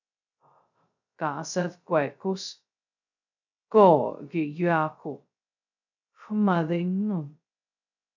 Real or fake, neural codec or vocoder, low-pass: fake; codec, 16 kHz, 0.2 kbps, FocalCodec; 7.2 kHz